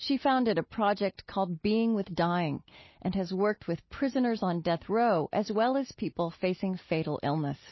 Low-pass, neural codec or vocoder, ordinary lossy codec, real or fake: 7.2 kHz; none; MP3, 24 kbps; real